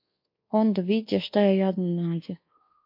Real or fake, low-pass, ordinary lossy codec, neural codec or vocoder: fake; 5.4 kHz; MP3, 32 kbps; codec, 24 kHz, 1.2 kbps, DualCodec